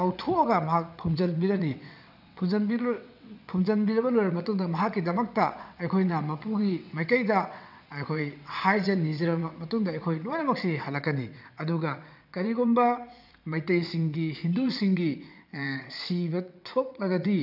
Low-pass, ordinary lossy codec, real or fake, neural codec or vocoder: 5.4 kHz; none; fake; vocoder, 22.05 kHz, 80 mel bands, WaveNeXt